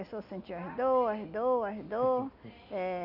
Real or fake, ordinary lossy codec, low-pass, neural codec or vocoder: real; none; 5.4 kHz; none